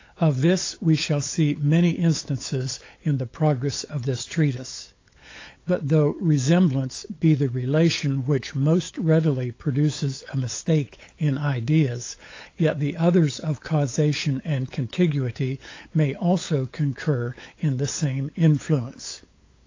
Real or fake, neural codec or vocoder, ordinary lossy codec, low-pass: fake; codec, 16 kHz, 8 kbps, FunCodec, trained on LibriTTS, 25 frames a second; AAC, 32 kbps; 7.2 kHz